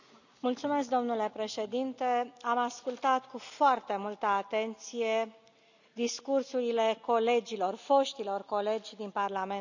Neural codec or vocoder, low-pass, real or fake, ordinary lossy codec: none; 7.2 kHz; real; none